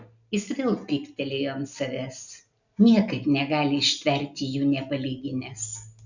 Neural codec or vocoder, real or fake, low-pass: none; real; 7.2 kHz